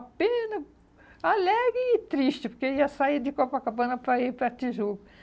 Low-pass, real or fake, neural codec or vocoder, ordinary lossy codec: none; real; none; none